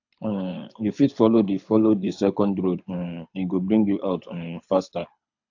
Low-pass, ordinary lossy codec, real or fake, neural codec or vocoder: 7.2 kHz; AAC, 48 kbps; fake; codec, 24 kHz, 6 kbps, HILCodec